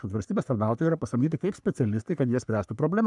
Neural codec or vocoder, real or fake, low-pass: codec, 44.1 kHz, 3.4 kbps, Pupu-Codec; fake; 10.8 kHz